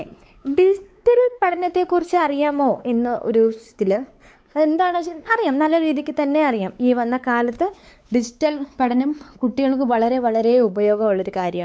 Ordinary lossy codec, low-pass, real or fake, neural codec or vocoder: none; none; fake; codec, 16 kHz, 4 kbps, X-Codec, WavLM features, trained on Multilingual LibriSpeech